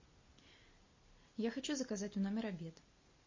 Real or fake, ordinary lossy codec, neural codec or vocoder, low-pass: real; MP3, 32 kbps; none; 7.2 kHz